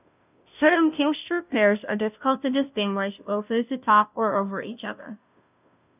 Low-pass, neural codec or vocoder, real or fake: 3.6 kHz; codec, 16 kHz, 0.5 kbps, FunCodec, trained on Chinese and English, 25 frames a second; fake